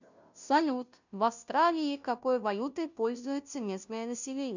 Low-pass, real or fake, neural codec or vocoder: 7.2 kHz; fake; codec, 16 kHz, 0.5 kbps, FunCodec, trained on Chinese and English, 25 frames a second